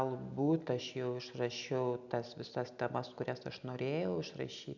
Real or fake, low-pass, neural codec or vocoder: real; 7.2 kHz; none